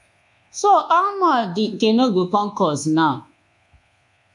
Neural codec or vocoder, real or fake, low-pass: codec, 24 kHz, 1.2 kbps, DualCodec; fake; 10.8 kHz